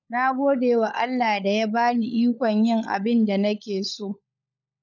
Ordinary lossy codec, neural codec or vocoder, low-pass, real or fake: none; codec, 16 kHz, 16 kbps, FunCodec, trained on LibriTTS, 50 frames a second; 7.2 kHz; fake